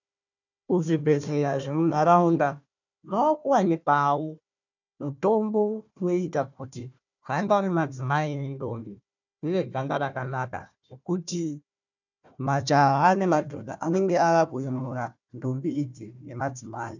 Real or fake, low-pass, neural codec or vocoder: fake; 7.2 kHz; codec, 16 kHz, 1 kbps, FunCodec, trained on Chinese and English, 50 frames a second